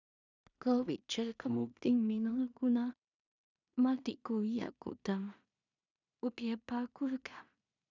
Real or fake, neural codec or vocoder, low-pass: fake; codec, 16 kHz in and 24 kHz out, 0.4 kbps, LongCat-Audio-Codec, two codebook decoder; 7.2 kHz